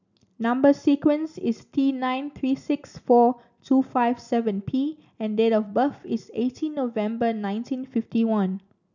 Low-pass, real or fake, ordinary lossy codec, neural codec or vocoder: 7.2 kHz; real; none; none